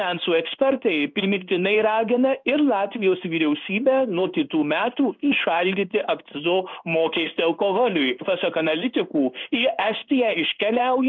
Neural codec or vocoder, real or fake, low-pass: codec, 16 kHz in and 24 kHz out, 1 kbps, XY-Tokenizer; fake; 7.2 kHz